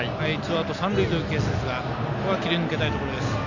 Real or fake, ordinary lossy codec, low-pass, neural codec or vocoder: real; none; 7.2 kHz; none